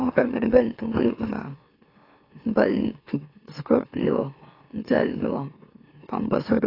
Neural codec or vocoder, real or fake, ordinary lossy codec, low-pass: autoencoder, 44.1 kHz, a latent of 192 numbers a frame, MeloTTS; fake; AAC, 24 kbps; 5.4 kHz